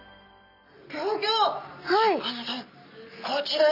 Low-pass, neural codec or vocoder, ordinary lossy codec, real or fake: 5.4 kHz; none; none; real